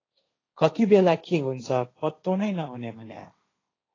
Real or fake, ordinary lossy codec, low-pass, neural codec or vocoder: fake; AAC, 32 kbps; 7.2 kHz; codec, 16 kHz, 1.1 kbps, Voila-Tokenizer